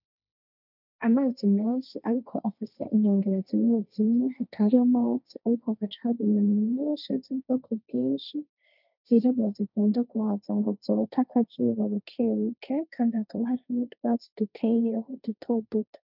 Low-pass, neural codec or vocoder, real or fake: 5.4 kHz; codec, 16 kHz, 1.1 kbps, Voila-Tokenizer; fake